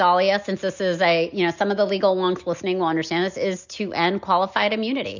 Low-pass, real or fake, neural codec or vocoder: 7.2 kHz; real; none